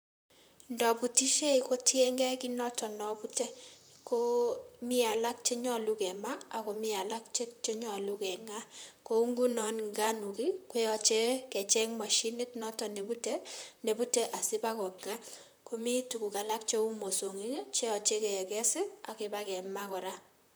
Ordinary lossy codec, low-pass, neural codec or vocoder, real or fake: none; none; vocoder, 44.1 kHz, 128 mel bands, Pupu-Vocoder; fake